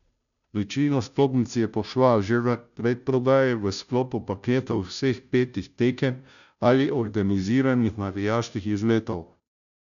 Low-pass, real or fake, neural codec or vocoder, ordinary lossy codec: 7.2 kHz; fake; codec, 16 kHz, 0.5 kbps, FunCodec, trained on Chinese and English, 25 frames a second; none